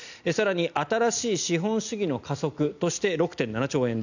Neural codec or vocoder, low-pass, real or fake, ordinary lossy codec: none; 7.2 kHz; real; none